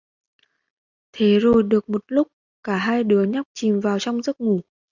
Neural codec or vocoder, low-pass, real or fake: none; 7.2 kHz; real